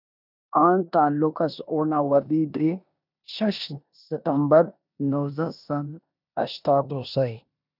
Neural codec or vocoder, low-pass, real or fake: codec, 16 kHz in and 24 kHz out, 0.9 kbps, LongCat-Audio-Codec, four codebook decoder; 5.4 kHz; fake